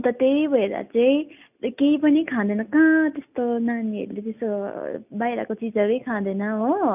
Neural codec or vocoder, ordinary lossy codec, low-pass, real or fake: none; none; 3.6 kHz; real